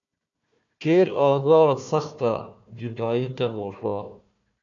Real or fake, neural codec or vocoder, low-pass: fake; codec, 16 kHz, 1 kbps, FunCodec, trained on Chinese and English, 50 frames a second; 7.2 kHz